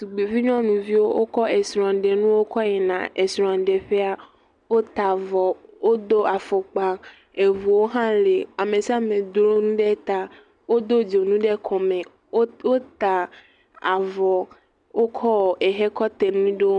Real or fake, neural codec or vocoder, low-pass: real; none; 10.8 kHz